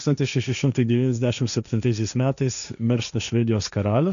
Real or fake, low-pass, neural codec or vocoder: fake; 7.2 kHz; codec, 16 kHz, 1.1 kbps, Voila-Tokenizer